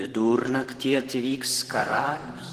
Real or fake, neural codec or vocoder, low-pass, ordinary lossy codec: fake; vocoder, 44.1 kHz, 128 mel bands, Pupu-Vocoder; 14.4 kHz; Opus, 16 kbps